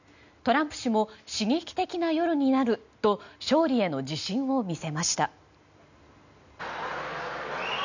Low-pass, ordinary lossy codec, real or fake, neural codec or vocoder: 7.2 kHz; none; real; none